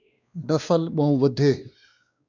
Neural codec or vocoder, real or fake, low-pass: codec, 16 kHz, 2 kbps, X-Codec, WavLM features, trained on Multilingual LibriSpeech; fake; 7.2 kHz